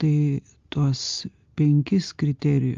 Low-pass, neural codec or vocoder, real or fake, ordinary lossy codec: 7.2 kHz; none; real; Opus, 32 kbps